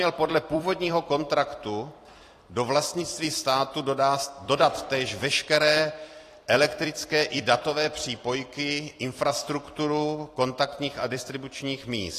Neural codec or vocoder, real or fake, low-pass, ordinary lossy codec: vocoder, 44.1 kHz, 128 mel bands every 256 samples, BigVGAN v2; fake; 14.4 kHz; AAC, 48 kbps